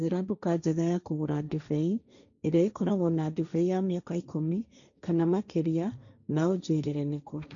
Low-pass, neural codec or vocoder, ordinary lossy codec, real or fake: 7.2 kHz; codec, 16 kHz, 1.1 kbps, Voila-Tokenizer; none; fake